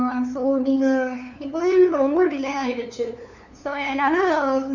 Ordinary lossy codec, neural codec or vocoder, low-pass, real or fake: none; codec, 16 kHz, 2 kbps, FunCodec, trained on LibriTTS, 25 frames a second; 7.2 kHz; fake